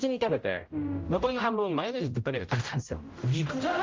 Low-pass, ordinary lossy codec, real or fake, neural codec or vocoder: 7.2 kHz; Opus, 24 kbps; fake; codec, 16 kHz, 0.5 kbps, X-Codec, HuBERT features, trained on general audio